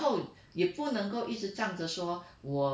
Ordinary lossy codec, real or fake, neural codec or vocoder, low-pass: none; real; none; none